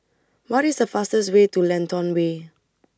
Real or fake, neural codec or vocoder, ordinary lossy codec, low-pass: real; none; none; none